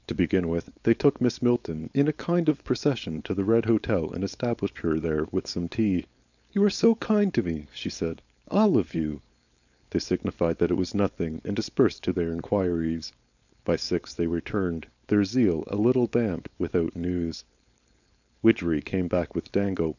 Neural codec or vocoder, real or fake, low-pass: codec, 16 kHz, 4.8 kbps, FACodec; fake; 7.2 kHz